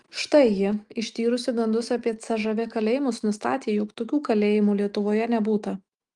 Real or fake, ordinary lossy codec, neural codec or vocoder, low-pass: fake; Opus, 24 kbps; autoencoder, 48 kHz, 128 numbers a frame, DAC-VAE, trained on Japanese speech; 10.8 kHz